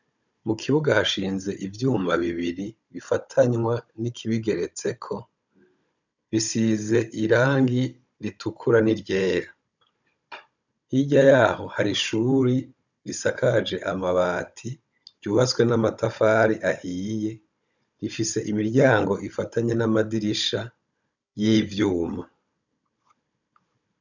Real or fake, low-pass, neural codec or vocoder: fake; 7.2 kHz; codec, 16 kHz, 16 kbps, FunCodec, trained on Chinese and English, 50 frames a second